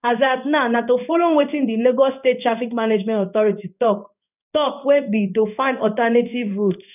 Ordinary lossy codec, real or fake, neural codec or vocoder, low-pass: none; fake; codec, 16 kHz in and 24 kHz out, 1 kbps, XY-Tokenizer; 3.6 kHz